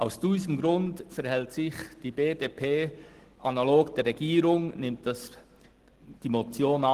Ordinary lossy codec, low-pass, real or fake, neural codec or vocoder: Opus, 16 kbps; 14.4 kHz; real; none